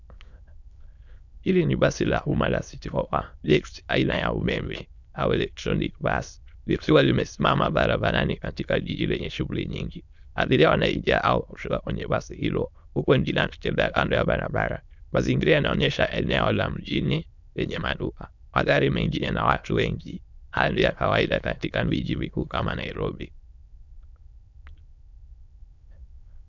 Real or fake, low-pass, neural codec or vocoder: fake; 7.2 kHz; autoencoder, 22.05 kHz, a latent of 192 numbers a frame, VITS, trained on many speakers